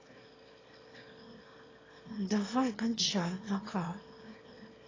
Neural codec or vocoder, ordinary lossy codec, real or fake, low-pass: autoencoder, 22.05 kHz, a latent of 192 numbers a frame, VITS, trained on one speaker; AAC, 32 kbps; fake; 7.2 kHz